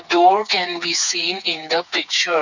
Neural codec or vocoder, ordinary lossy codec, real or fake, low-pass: codec, 16 kHz, 4 kbps, FreqCodec, smaller model; none; fake; 7.2 kHz